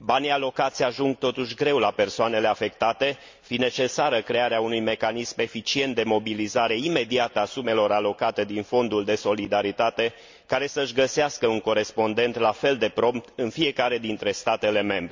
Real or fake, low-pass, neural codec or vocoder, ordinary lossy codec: real; 7.2 kHz; none; MP3, 64 kbps